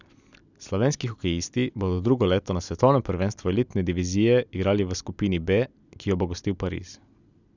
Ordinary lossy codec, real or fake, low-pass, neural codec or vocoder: none; real; 7.2 kHz; none